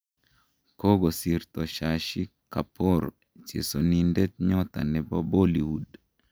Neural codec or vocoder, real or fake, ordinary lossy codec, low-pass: none; real; none; none